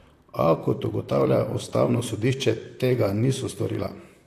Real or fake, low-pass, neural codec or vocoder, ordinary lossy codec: fake; 14.4 kHz; vocoder, 44.1 kHz, 128 mel bands, Pupu-Vocoder; AAC, 64 kbps